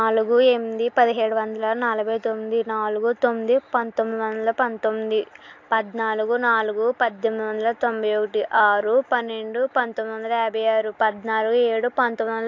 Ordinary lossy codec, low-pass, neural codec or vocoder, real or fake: AAC, 48 kbps; 7.2 kHz; none; real